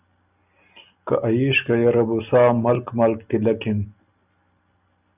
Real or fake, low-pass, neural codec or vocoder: real; 3.6 kHz; none